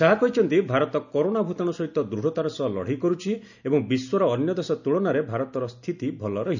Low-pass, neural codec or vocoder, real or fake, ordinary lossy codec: 7.2 kHz; none; real; none